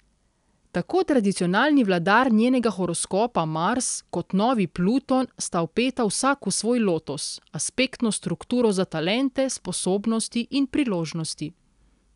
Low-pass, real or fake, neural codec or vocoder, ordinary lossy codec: 10.8 kHz; real; none; none